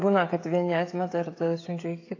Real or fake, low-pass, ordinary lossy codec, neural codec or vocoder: fake; 7.2 kHz; AAC, 32 kbps; codec, 16 kHz, 16 kbps, FreqCodec, smaller model